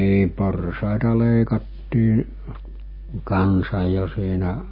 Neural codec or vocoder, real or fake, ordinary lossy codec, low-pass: none; real; MP3, 24 kbps; 5.4 kHz